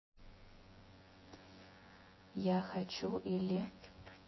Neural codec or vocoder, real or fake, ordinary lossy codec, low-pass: vocoder, 24 kHz, 100 mel bands, Vocos; fake; MP3, 24 kbps; 7.2 kHz